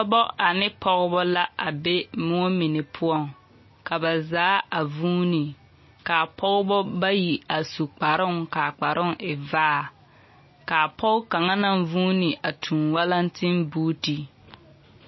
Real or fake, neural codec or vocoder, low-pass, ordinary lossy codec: real; none; 7.2 kHz; MP3, 24 kbps